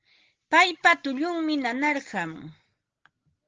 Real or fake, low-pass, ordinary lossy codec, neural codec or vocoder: real; 7.2 kHz; Opus, 16 kbps; none